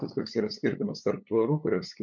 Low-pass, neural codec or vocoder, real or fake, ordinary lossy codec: 7.2 kHz; codec, 16 kHz, 8 kbps, FunCodec, trained on LibriTTS, 25 frames a second; fake; MP3, 64 kbps